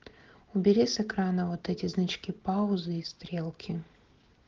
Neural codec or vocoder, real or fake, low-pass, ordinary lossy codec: none; real; 7.2 kHz; Opus, 16 kbps